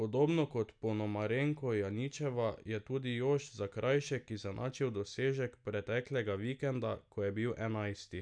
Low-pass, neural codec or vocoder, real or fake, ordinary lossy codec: 9.9 kHz; none; real; none